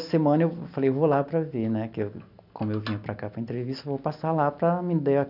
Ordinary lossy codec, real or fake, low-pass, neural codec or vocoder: none; real; 5.4 kHz; none